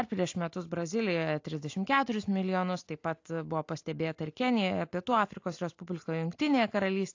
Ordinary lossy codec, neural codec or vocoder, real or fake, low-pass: AAC, 48 kbps; none; real; 7.2 kHz